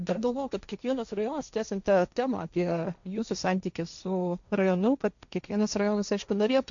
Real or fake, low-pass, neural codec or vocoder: fake; 7.2 kHz; codec, 16 kHz, 1.1 kbps, Voila-Tokenizer